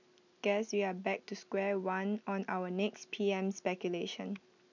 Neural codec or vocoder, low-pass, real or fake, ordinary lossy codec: none; 7.2 kHz; real; none